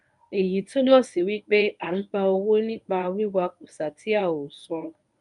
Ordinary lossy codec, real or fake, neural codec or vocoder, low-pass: none; fake; codec, 24 kHz, 0.9 kbps, WavTokenizer, medium speech release version 1; 10.8 kHz